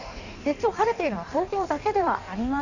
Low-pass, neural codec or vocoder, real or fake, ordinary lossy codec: 7.2 kHz; codec, 16 kHz in and 24 kHz out, 1.1 kbps, FireRedTTS-2 codec; fake; none